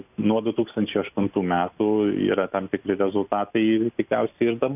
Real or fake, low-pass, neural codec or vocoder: real; 3.6 kHz; none